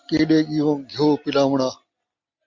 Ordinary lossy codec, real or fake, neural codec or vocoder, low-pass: MP3, 48 kbps; real; none; 7.2 kHz